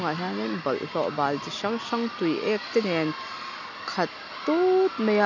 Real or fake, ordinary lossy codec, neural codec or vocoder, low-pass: real; none; none; 7.2 kHz